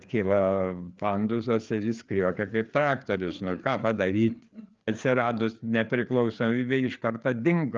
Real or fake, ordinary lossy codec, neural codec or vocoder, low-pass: fake; Opus, 24 kbps; codec, 16 kHz, 4 kbps, FreqCodec, larger model; 7.2 kHz